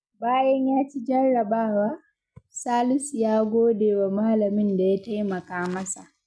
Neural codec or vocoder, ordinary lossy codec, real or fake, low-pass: none; none; real; none